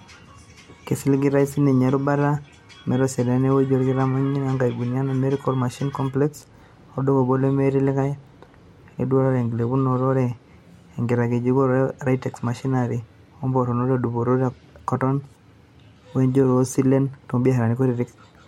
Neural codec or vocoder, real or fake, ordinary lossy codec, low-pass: none; real; MP3, 64 kbps; 19.8 kHz